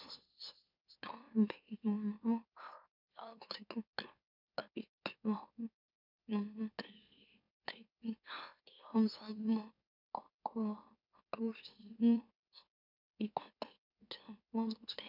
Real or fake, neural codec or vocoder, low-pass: fake; autoencoder, 44.1 kHz, a latent of 192 numbers a frame, MeloTTS; 5.4 kHz